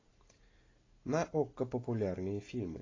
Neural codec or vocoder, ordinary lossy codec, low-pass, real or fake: none; AAC, 32 kbps; 7.2 kHz; real